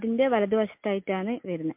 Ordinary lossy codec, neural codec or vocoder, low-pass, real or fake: MP3, 32 kbps; none; 3.6 kHz; real